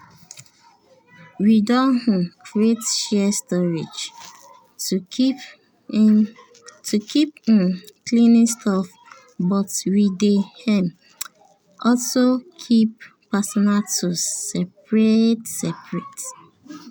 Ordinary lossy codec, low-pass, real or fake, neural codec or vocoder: none; none; real; none